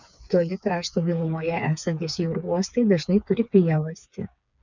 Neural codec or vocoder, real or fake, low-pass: codec, 16 kHz, 4 kbps, FreqCodec, smaller model; fake; 7.2 kHz